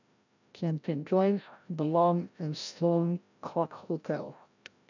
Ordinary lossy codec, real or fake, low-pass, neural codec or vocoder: none; fake; 7.2 kHz; codec, 16 kHz, 0.5 kbps, FreqCodec, larger model